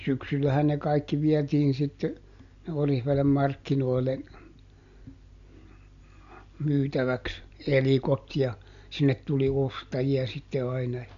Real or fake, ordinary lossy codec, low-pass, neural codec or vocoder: real; MP3, 64 kbps; 7.2 kHz; none